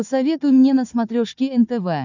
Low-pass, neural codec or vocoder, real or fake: 7.2 kHz; codec, 16 kHz, 4 kbps, FunCodec, trained on Chinese and English, 50 frames a second; fake